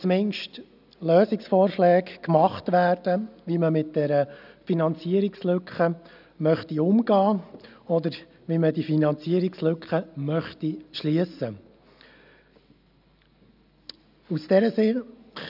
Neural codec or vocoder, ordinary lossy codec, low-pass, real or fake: none; none; 5.4 kHz; real